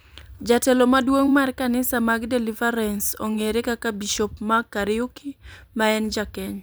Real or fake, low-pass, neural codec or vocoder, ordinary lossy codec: fake; none; vocoder, 44.1 kHz, 128 mel bands every 256 samples, BigVGAN v2; none